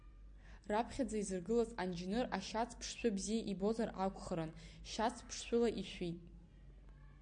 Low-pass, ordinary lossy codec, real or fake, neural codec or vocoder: 9.9 kHz; AAC, 64 kbps; real; none